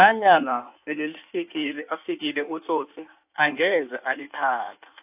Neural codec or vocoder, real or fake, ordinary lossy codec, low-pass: codec, 16 kHz in and 24 kHz out, 1.1 kbps, FireRedTTS-2 codec; fake; AAC, 32 kbps; 3.6 kHz